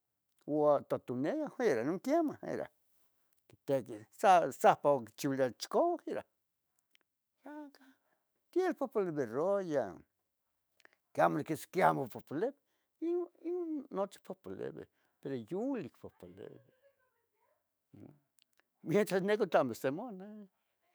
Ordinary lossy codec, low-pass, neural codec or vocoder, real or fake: none; none; autoencoder, 48 kHz, 128 numbers a frame, DAC-VAE, trained on Japanese speech; fake